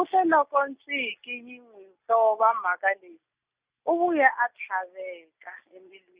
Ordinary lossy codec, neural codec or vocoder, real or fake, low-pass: Opus, 64 kbps; none; real; 3.6 kHz